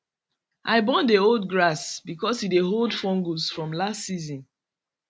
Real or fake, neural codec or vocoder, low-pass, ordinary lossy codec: real; none; none; none